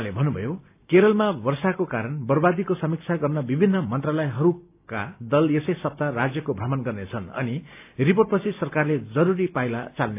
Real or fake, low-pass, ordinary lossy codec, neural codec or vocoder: real; 3.6 kHz; AAC, 32 kbps; none